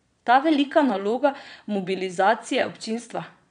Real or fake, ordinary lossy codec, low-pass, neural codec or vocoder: fake; none; 9.9 kHz; vocoder, 22.05 kHz, 80 mel bands, Vocos